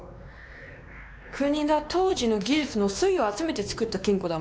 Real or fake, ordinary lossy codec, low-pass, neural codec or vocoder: fake; none; none; codec, 16 kHz, 2 kbps, X-Codec, WavLM features, trained on Multilingual LibriSpeech